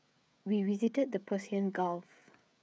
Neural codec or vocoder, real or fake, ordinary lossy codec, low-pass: codec, 16 kHz, 16 kbps, FreqCodec, smaller model; fake; none; none